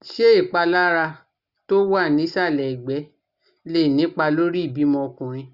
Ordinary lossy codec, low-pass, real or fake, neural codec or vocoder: Opus, 64 kbps; 5.4 kHz; real; none